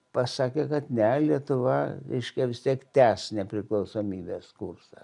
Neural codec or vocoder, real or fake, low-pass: none; real; 10.8 kHz